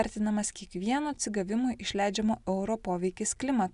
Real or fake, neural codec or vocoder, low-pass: real; none; 10.8 kHz